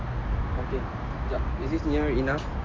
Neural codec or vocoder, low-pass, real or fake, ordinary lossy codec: none; 7.2 kHz; real; AAC, 32 kbps